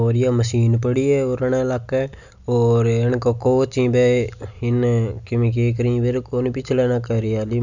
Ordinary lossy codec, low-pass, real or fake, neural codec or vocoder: none; 7.2 kHz; real; none